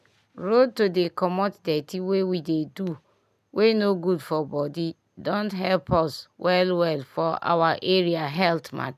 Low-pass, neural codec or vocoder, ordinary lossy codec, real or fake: 14.4 kHz; none; none; real